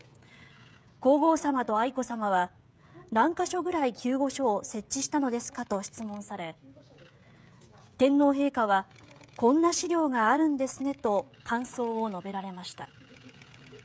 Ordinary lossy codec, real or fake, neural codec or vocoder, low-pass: none; fake; codec, 16 kHz, 16 kbps, FreqCodec, smaller model; none